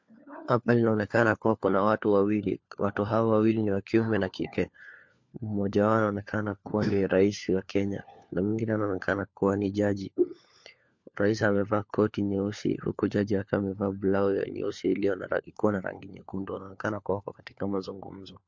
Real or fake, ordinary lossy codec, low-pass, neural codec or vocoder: fake; MP3, 48 kbps; 7.2 kHz; codec, 16 kHz, 4 kbps, FunCodec, trained on LibriTTS, 50 frames a second